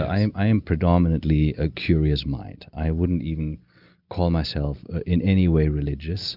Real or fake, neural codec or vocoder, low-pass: real; none; 5.4 kHz